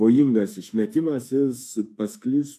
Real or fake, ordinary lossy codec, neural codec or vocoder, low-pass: fake; AAC, 64 kbps; autoencoder, 48 kHz, 32 numbers a frame, DAC-VAE, trained on Japanese speech; 14.4 kHz